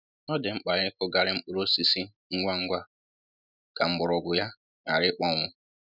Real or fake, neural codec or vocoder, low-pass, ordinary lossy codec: real; none; 5.4 kHz; none